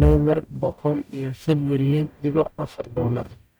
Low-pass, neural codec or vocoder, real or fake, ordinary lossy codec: none; codec, 44.1 kHz, 0.9 kbps, DAC; fake; none